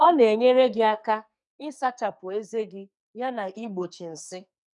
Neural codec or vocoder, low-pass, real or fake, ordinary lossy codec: codec, 44.1 kHz, 2.6 kbps, SNAC; 10.8 kHz; fake; none